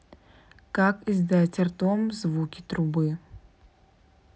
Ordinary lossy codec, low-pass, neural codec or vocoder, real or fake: none; none; none; real